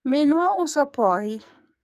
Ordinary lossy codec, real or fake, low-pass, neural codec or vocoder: none; fake; 14.4 kHz; codec, 44.1 kHz, 2.6 kbps, SNAC